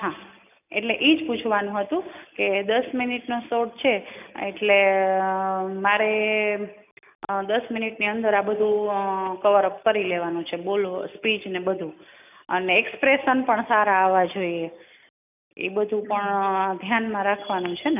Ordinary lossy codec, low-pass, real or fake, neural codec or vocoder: none; 3.6 kHz; real; none